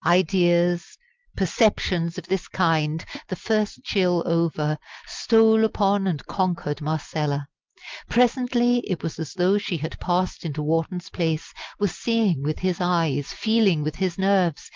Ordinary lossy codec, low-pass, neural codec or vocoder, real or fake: Opus, 24 kbps; 7.2 kHz; none; real